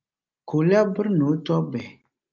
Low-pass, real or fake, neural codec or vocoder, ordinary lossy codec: 7.2 kHz; real; none; Opus, 24 kbps